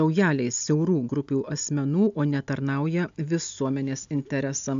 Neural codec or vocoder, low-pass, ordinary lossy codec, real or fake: none; 7.2 kHz; AAC, 96 kbps; real